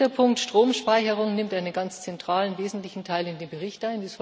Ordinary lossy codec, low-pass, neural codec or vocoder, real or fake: none; none; none; real